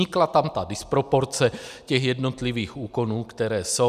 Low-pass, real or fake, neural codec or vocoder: 14.4 kHz; real; none